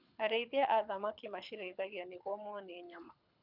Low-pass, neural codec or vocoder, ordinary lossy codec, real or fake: 5.4 kHz; codec, 24 kHz, 6 kbps, HILCodec; none; fake